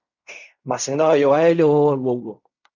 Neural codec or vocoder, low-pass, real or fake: codec, 16 kHz in and 24 kHz out, 0.4 kbps, LongCat-Audio-Codec, fine tuned four codebook decoder; 7.2 kHz; fake